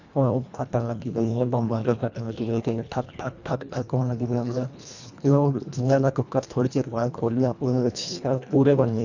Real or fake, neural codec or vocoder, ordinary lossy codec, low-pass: fake; codec, 24 kHz, 1.5 kbps, HILCodec; none; 7.2 kHz